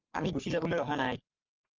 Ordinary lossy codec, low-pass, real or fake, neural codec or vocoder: Opus, 24 kbps; 7.2 kHz; fake; codec, 44.1 kHz, 3.4 kbps, Pupu-Codec